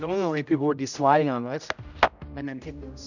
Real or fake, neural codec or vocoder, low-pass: fake; codec, 16 kHz, 0.5 kbps, X-Codec, HuBERT features, trained on general audio; 7.2 kHz